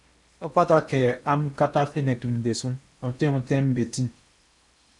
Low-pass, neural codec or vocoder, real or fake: 10.8 kHz; codec, 16 kHz in and 24 kHz out, 0.8 kbps, FocalCodec, streaming, 65536 codes; fake